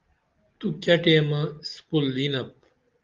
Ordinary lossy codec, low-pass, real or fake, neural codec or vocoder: Opus, 32 kbps; 7.2 kHz; real; none